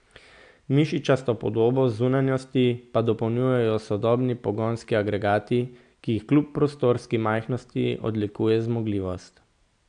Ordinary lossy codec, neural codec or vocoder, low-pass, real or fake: AAC, 96 kbps; none; 9.9 kHz; real